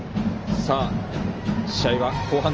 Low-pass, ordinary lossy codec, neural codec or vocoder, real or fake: 7.2 kHz; Opus, 24 kbps; none; real